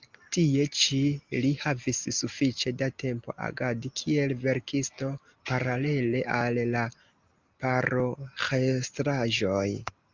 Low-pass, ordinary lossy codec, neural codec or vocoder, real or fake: 7.2 kHz; Opus, 24 kbps; none; real